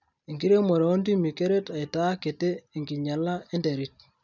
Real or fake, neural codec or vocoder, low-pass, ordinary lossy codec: real; none; 7.2 kHz; none